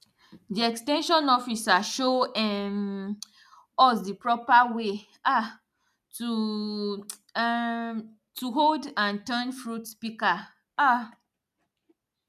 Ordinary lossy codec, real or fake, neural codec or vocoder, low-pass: none; real; none; 14.4 kHz